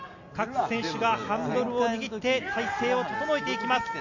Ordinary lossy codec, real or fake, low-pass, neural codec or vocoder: none; real; 7.2 kHz; none